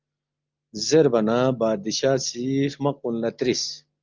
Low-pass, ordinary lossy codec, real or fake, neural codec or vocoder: 7.2 kHz; Opus, 24 kbps; real; none